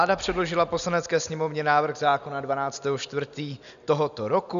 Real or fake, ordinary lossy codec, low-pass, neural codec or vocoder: real; MP3, 96 kbps; 7.2 kHz; none